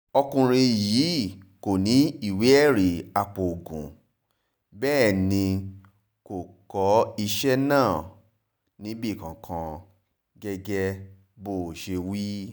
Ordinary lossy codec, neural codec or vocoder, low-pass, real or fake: none; none; none; real